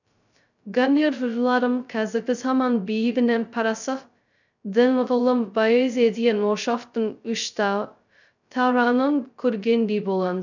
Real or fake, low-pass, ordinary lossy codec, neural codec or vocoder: fake; 7.2 kHz; none; codec, 16 kHz, 0.2 kbps, FocalCodec